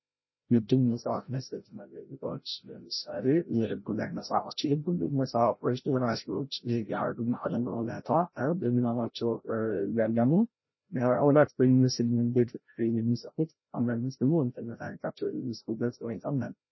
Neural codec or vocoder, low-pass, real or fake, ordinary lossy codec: codec, 16 kHz, 0.5 kbps, FreqCodec, larger model; 7.2 kHz; fake; MP3, 24 kbps